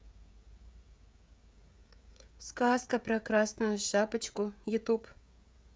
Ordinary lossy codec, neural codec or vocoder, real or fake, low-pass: none; codec, 16 kHz, 16 kbps, FreqCodec, smaller model; fake; none